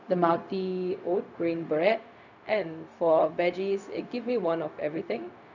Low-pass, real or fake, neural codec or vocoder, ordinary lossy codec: 7.2 kHz; fake; codec, 16 kHz, 0.4 kbps, LongCat-Audio-Codec; none